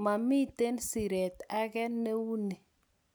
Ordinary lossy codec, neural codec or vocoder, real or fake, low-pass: none; none; real; none